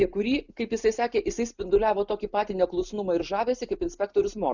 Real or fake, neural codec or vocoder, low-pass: real; none; 7.2 kHz